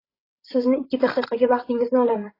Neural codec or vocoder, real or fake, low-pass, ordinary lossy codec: codec, 16 kHz, 16 kbps, FreqCodec, larger model; fake; 5.4 kHz; AAC, 24 kbps